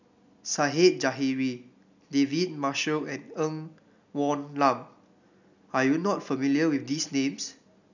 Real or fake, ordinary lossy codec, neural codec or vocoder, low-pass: real; none; none; 7.2 kHz